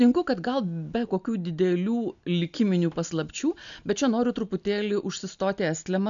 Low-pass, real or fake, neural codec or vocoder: 7.2 kHz; real; none